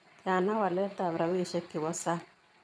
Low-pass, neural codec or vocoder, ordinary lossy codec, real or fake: none; vocoder, 22.05 kHz, 80 mel bands, WaveNeXt; none; fake